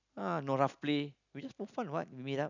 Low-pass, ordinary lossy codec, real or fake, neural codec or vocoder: 7.2 kHz; none; real; none